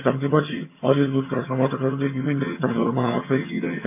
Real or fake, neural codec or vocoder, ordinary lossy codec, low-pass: fake; vocoder, 22.05 kHz, 80 mel bands, HiFi-GAN; MP3, 24 kbps; 3.6 kHz